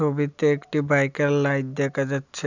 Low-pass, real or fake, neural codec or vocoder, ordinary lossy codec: 7.2 kHz; real; none; none